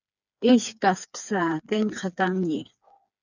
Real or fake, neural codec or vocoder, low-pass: fake; codec, 16 kHz, 4 kbps, FreqCodec, smaller model; 7.2 kHz